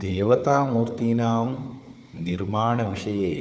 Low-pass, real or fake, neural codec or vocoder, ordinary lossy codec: none; fake; codec, 16 kHz, 4 kbps, FunCodec, trained on Chinese and English, 50 frames a second; none